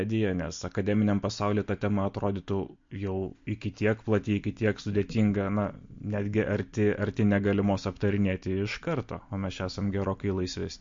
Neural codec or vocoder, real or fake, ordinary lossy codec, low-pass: none; real; MP3, 48 kbps; 7.2 kHz